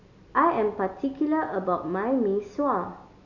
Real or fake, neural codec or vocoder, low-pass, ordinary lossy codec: real; none; 7.2 kHz; none